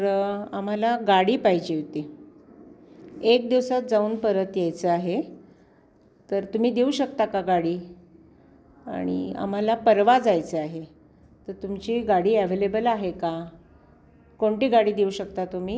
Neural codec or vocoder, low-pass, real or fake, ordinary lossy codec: none; none; real; none